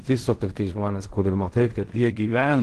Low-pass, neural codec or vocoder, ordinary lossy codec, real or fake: 10.8 kHz; codec, 16 kHz in and 24 kHz out, 0.4 kbps, LongCat-Audio-Codec, fine tuned four codebook decoder; Opus, 16 kbps; fake